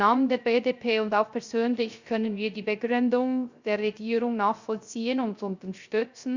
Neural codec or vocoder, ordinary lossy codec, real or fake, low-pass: codec, 16 kHz, 0.3 kbps, FocalCodec; Opus, 64 kbps; fake; 7.2 kHz